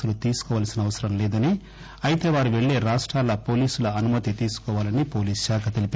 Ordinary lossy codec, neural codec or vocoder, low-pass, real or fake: none; none; none; real